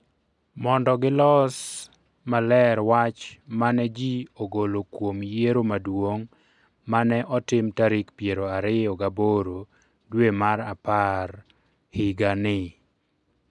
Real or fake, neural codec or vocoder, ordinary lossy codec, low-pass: real; none; none; 10.8 kHz